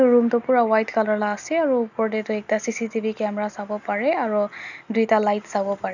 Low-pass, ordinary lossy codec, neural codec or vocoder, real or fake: 7.2 kHz; none; none; real